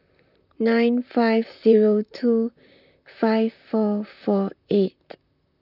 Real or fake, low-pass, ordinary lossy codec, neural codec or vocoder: fake; 5.4 kHz; none; vocoder, 44.1 kHz, 128 mel bands, Pupu-Vocoder